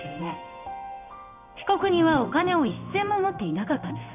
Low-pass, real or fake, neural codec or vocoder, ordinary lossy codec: 3.6 kHz; fake; codec, 16 kHz in and 24 kHz out, 1 kbps, XY-Tokenizer; none